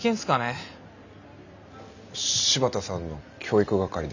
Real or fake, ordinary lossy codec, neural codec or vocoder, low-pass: real; none; none; 7.2 kHz